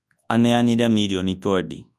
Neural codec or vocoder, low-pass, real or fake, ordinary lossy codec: codec, 24 kHz, 0.9 kbps, WavTokenizer, large speech release; none; fake; none